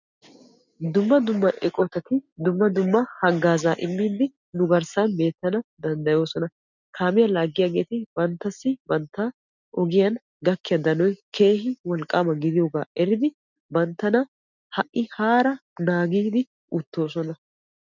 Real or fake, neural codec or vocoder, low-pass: real; none; 7.2 kHz